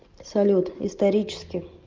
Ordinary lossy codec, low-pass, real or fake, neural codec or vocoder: Opus, 24 kbps; 7.2 kHz; real; none